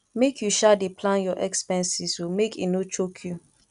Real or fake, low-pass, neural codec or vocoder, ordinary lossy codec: real; 10.8 kHz; none; none